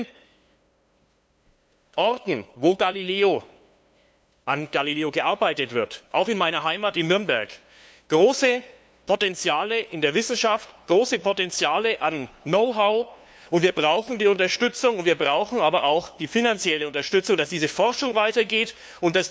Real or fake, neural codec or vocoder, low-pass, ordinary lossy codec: fake; codec, 16 kHz, 2 kbps, FunCodec, trained on LibriTTS, 25 frames a second; none; none